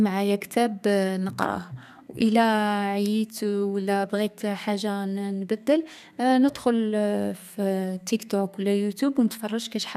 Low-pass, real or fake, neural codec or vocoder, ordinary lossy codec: 14.4 kHz; fake; codec, 32 kHz, 1.9 kbps, SNAC; none